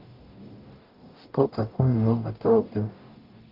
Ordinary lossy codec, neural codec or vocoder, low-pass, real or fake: Opus, 32 kbps; codec, 44.1 kHz, 0.9 kbps, DAC; 5.4 kHz; fake